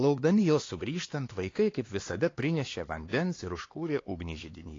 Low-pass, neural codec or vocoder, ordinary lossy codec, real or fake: 7.2 kHz; codec, 16 kHz, 2 kbps, X-Codec, HuBERT features, trained on LibriSpeech; AAC, 32 kbps; fake